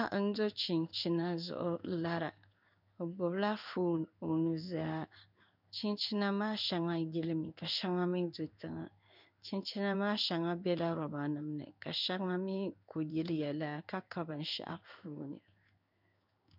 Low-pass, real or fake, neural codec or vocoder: 5.4 kHz; fake; codec, 16 kHz in and 24 kHz out, 1 kbps, XY-Tokenizer